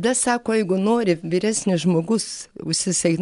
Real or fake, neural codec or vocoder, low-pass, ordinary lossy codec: real; none; 10.8 kHz; MP3, 96 kbps